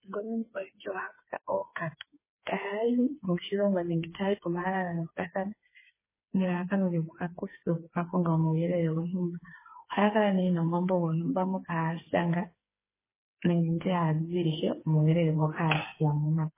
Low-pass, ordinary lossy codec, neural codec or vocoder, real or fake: 3.6 kHz; MP3, 16 kbps; codec, 16 kHz, 4 kbps, FreqCodec, smaller model; fake